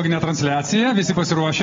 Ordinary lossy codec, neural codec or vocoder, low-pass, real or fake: AAC, 24 kbps; none; 7.2 kHz; real